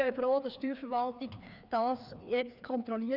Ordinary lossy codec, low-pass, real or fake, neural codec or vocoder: none; 5.4 kHz; fake; codec, 16 kHz, 2 kbps, FreqCodec, larger model